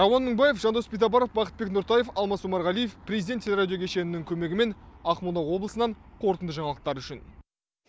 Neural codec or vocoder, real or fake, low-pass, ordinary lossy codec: none; real; none; none